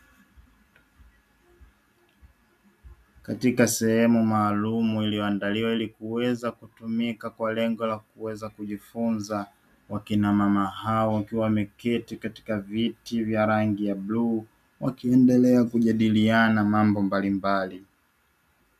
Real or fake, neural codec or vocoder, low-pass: real; none; 14.4 kHz